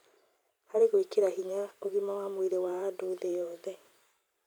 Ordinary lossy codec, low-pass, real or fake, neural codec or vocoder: none; none; fake; vocoder, 44.1 kHz, 128 mel bands every 512 samples, BigVGAN v2